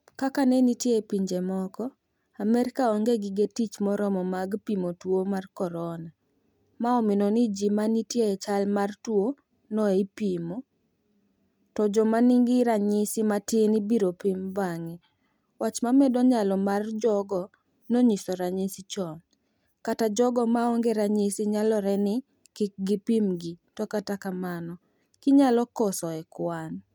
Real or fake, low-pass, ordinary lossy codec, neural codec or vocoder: real; 19.8 kHz; none; none